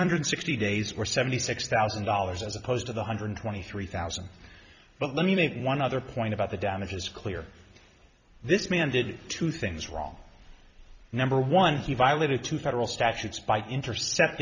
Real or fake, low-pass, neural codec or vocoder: fake; 7.2 kHz; vocoder, 44.1 kHz, 128 mel bands every 256 samples, BigVGAN v2